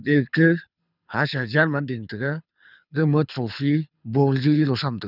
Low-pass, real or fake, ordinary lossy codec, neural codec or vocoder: 5.4 kHz; fake; none; codec, 24 kHz, 6 kbps, HILCodec